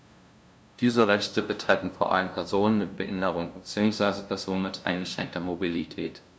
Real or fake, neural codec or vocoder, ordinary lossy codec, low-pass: fake; codec, 16 kHz, 0.5 kbps, FunCodec, trained on LibriTTS, 25 frames a second; none; none